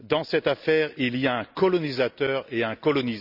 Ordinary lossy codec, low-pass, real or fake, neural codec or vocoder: none; 5.4 kHz; real; none